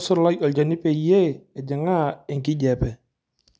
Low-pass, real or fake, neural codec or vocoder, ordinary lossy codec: none; real; none; none